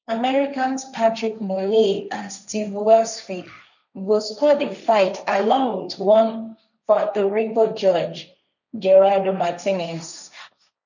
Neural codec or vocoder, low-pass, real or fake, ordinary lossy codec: codec, 16 kHz, 1.1 kbps, Voila-Tokenizer; 7.2 kHz; fake; none